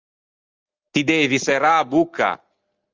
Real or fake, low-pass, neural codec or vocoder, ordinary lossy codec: real; 7.2 kHz; none; Opus, 32 kbps